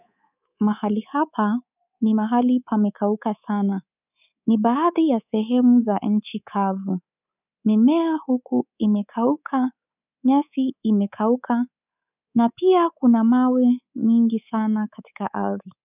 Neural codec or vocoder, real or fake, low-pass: codec, 24 kHz, 3.1 kbps, DualCodec; fake; 3.6 kHz